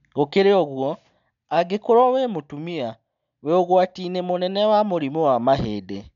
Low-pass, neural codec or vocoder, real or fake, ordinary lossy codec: 7.2 kHz; none; real; none